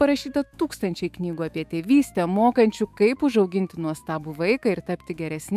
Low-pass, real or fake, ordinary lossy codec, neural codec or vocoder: 14.4 kHz; fake; AAC, 96 kbps; autoencoder, 48 kHz, 128 numbers a frame, DAC-VAE, trained on Japanese speech